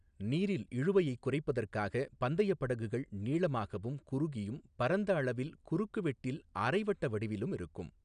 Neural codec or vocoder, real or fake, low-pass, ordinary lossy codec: none; real; 9.9 kHz; none